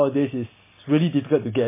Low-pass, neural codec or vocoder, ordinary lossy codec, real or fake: 3.6 kHz; none; MP3, 16 kbps; real